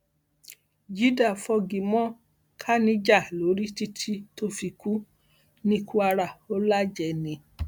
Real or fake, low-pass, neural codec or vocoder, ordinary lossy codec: real; 19.8 kHz; none; none